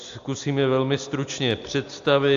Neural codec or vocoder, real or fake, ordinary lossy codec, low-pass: none; real; AAC, 64 kbps; 7.2 kHz